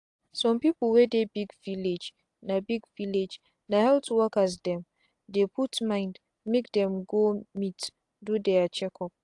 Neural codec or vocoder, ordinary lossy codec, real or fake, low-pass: none; AAC, 64 kbps; real; 10.8 kHz